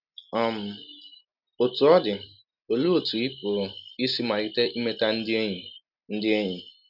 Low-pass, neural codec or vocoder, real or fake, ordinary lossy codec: 5.4 kHz; none; real; none